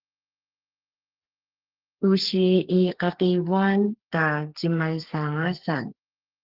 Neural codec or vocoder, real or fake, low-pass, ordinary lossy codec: codec, 32 kHz, 1.9 kbps, SNAC; fake; 5.4 kHz; Opus, 16 kbps